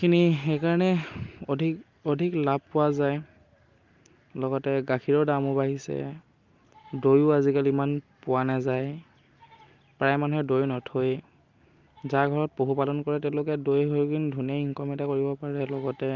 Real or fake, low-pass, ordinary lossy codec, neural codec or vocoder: real; 7.2 kHz; Opus, 32 kbps; none